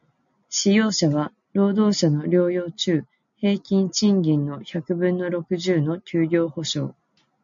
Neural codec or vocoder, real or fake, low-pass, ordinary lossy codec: none; real; 7.2 kHz; MP3, 64 kbps